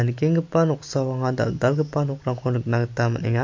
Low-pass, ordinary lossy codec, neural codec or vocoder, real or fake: 7.2 kHz; MP3, 48 kbps; none; real